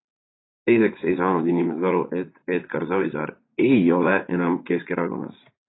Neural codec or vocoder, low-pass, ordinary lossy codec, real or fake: vocoder, 44.1 kHz, 80 mel bands, Vocos; 7.2 kHz; AAC, 16 kbps; fake